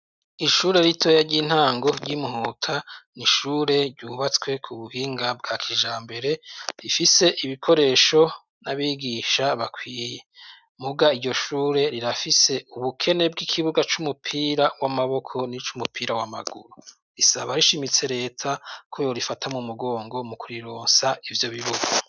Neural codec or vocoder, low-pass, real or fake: none; 7.2 kHz; real